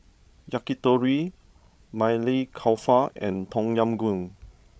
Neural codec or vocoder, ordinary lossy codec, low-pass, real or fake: codec, 16 kHz, 16 kbps, FreqCodec, larger model; none; none; fake